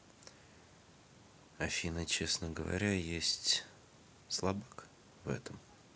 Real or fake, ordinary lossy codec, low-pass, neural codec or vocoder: real; none; none; none